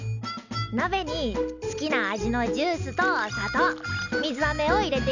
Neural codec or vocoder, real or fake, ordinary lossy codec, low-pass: none; real; none; 7.2 kHz